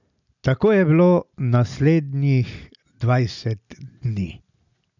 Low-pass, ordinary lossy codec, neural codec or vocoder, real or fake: 7.2 kHz; none; none; real